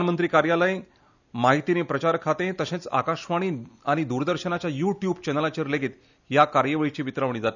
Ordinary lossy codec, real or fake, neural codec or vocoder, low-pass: none; real; none; 7.2 kHz